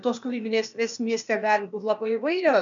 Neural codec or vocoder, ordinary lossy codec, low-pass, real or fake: codec, 16 kHz, 0.8 kbps, ZipCodec; MP3, 96 kbps; 7.2 kHz; fake